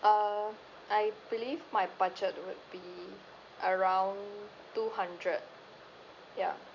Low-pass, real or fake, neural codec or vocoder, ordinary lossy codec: 7.2 kHz; real; none; none